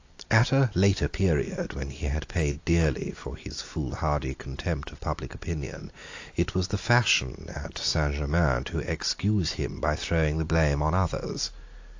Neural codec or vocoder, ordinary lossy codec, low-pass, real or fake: none; AAC, 48 kbps; 7.2 kHz; real